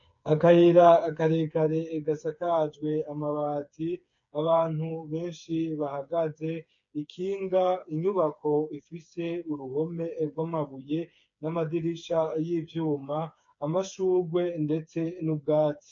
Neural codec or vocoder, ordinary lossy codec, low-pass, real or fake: codec, 16 kHz, 4 kbps, FreqCodec, smaller model; MP3, 48 kbps; 7.2 kHz; fake